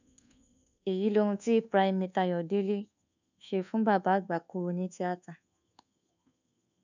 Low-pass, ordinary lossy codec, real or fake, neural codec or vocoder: 7.2 kHz; none; fake; codec, 24 kHz, 1.2 kbps, DualCodec